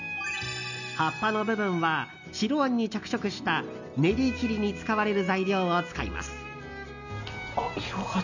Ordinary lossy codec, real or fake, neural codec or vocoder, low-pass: none; real; none; 7.2 kHz